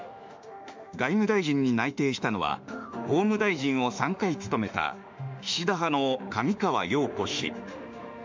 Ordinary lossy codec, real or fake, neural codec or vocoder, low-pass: MP3, 64 kbps; fake; autoencoder, 48 kHz, 32 numbers a frame, DAC-VAE, trained on Japanese speech; 7.2 kHz